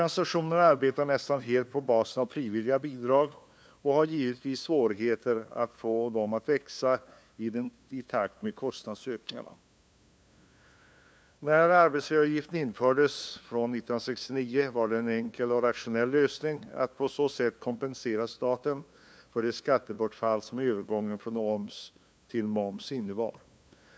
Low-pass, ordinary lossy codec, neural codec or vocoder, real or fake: none; none; codec, 16 kHz, 2 kbps, FunCodec, trained on LibriTTS, 25 frames a second; fake